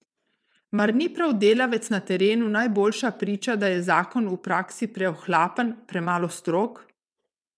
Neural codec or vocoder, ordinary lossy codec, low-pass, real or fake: vocoder, 22.05 kHz, 80 mel bands, WaveNeXt; none; none; fake